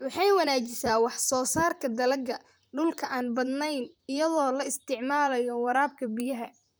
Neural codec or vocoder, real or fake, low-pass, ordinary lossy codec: vocoder, 44.1 kHz, 128 mel bands, Pupu-Vocoder; fake; none; none